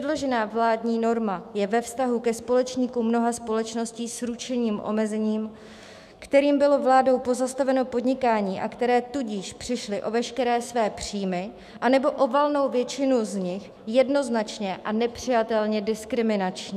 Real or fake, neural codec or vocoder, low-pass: fake; autoencoder, 48 kHz, 128 numbers a frame, DAC-VAE, trained on Japanese speech; 14.4 kHz